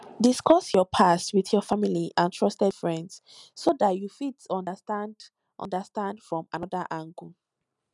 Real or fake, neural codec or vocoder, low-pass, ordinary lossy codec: real; none; 10.8 kHz; none